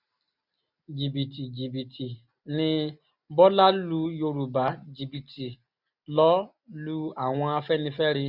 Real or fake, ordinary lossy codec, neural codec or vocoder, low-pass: real; none; none; 5.4 kHz